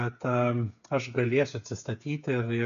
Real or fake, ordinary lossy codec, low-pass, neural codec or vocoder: fake; AAC, 96 kbps; 7.2 kHz; codec, 16 kHz, 8 kbps, FreqCodec, smaller model